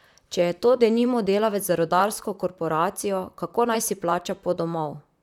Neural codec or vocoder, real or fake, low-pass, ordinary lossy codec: vocoder, 44.1 kHz, 128 mel bands, Pupu-Vocoder; fake; 19.8 kHz; none